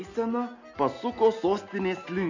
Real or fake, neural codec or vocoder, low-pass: real; none; 7.2 kHz